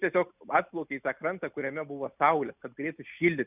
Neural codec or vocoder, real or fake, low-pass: none; real; 3.6 kHz